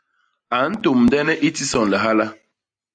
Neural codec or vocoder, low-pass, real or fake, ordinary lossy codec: none; 9.9 kHz; real; AAC, 64 kbps